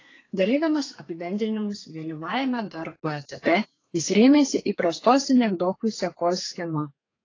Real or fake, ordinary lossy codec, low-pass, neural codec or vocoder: fake; AAC, 32 kbps; 7.2 kHz; codec, 32 kHz, 1.9 kbps, SNAC